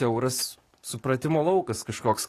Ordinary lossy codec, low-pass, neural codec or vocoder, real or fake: AAC, 48 kbps; 14.4 kHz; codec, 44.1 kHz, 7.8 kbps, DAC; fake